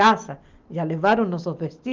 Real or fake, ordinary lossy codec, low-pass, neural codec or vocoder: real; Opus, 24 kbps; 7.2 kHz; none